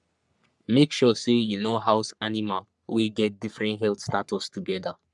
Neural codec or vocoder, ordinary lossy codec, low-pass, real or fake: codec, 44.1 kHz, 3.4 kbps, Pupu-Codec; none; 10.8 kHz; fake